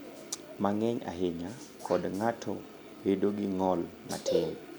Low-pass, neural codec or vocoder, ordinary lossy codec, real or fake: none; none; none; real